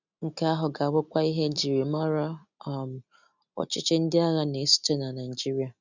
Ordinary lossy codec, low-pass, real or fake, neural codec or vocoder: none; 7.2 kHz; real; none